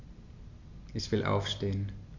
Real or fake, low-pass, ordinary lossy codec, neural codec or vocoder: real; 7.2 kHz; Opus, 64 kbps; none